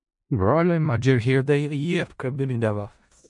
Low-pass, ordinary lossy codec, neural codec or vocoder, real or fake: 10.8 kHz; MP3, 48 kbps; codec, 16 kHz in and 24 kHz out, 0.4 kbps, LongCat-Audio-Codec, four codebook decoder; fake